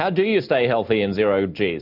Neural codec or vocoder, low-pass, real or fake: none; 5.4 kHz; real